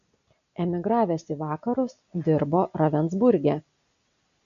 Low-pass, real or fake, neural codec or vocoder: 7.2 kHz; real; none